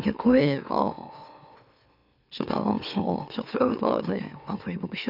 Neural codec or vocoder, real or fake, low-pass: autoencoder, 44.1 kHz, a latent of 192 numbers a frame, MeloTTS; fake; 5.4 kHz